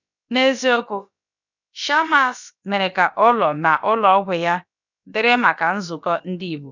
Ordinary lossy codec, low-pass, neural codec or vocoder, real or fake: none; 7.2 kHz; codec, 16 kHz, about 1 kbps, DyCAST, with the encoder's durations; fake